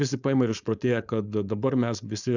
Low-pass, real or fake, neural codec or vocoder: 7.2 kHz; fake; codec, 16 kHz, 4.8 kbps, FACodec